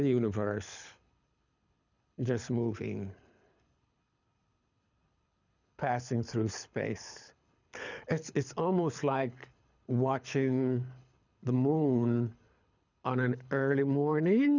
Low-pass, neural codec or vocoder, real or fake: 7.2 kHz; codec, 24 kHz, 6 kbps, HILCodec; fake